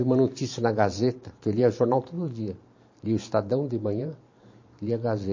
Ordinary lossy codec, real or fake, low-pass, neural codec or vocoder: MP3, 32 kbps; fake; 7.2 kHz; vocoder, 44.1 kHz, 128 mel bands every 512 samples, BigVGAN v2